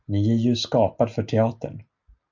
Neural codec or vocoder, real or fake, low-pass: none; real; 7.2 kHz